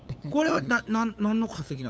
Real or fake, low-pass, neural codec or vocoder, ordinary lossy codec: fake; none; codec, 16 kHz, 8 kbps, FunCodec, trained on LibriTTS, 25 frames a second; none